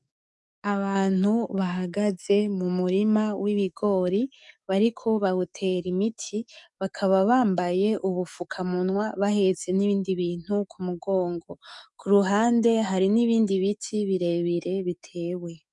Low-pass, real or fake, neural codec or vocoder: 10.8 kHz; fake; codec, 44.1 kHz, 7.8 kbps, DAC